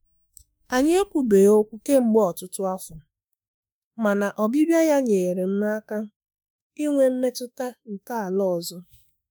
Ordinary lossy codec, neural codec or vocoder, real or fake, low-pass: none; autoencoder, 48 kHz, 32 numbers a frame, DAC-VAE, trained on Japanese speech; fake; none